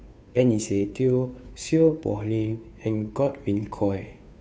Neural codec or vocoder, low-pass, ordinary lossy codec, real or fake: codec, 16 kHz, 2 kbps, FunCodec, trained on Chinese and English, 25 frames a second; none; none; fake